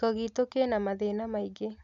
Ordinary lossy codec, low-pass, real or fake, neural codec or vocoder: none; 7.2 kHz; real; none